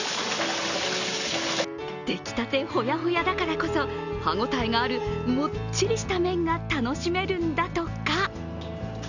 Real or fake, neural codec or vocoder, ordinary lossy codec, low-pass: real; none; none; 7.2 kHz